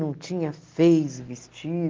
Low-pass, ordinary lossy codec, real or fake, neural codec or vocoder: 7.2 kHz; Opus, 16 kbps; real; none